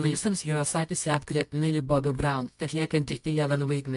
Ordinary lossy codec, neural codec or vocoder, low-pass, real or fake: MP3, 64 kbps; codec, 24 kHz, 0.9 kbps, WavTokenizer, medium music audio release; 10.8 kHz; fake